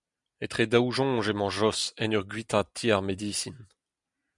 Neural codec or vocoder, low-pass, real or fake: none; 10.8 kHz; real